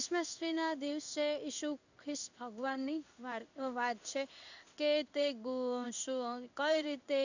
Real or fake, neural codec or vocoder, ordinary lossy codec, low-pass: fake; codec, 16 kHz in and 24 kHz out, 1 kbps, XY-Tokenizer; none; 7.2 kHz